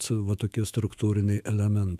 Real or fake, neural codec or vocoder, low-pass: fake; autoencoder, 48 kHz, 128 numbers a frame, DAC-VAE, trained on Japanese speech; 14.4 kHz